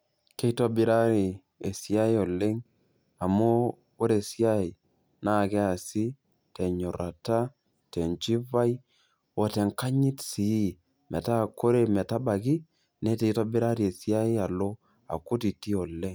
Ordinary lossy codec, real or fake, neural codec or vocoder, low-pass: none; real; none; none